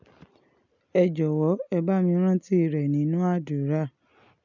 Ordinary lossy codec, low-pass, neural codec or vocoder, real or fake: none; 7.2 kHz; none; real